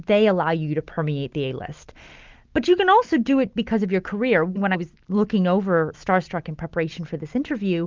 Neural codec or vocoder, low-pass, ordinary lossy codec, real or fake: none; 7.2 kHz; Opus, 24 kbps; real